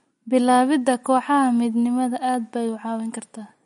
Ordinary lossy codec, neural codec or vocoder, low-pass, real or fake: MP3, 48 kbps; none; 19.8 kHz; real